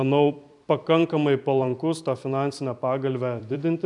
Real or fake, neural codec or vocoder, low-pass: fake; vocoder, 44.1 kHz, 128 mel bands every 256 samples, BigVGAN v2; 10.8 kHz